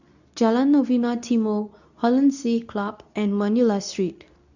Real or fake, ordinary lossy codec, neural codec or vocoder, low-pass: fake; none; codec, 24 kHz, 0.9 kbps, WavTokenizer, medium speech release version 2; 7.2 kHz